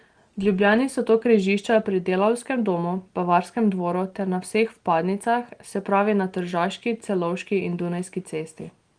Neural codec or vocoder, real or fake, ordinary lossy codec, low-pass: none; real; Opus, 24 kbps; 9.9 kHz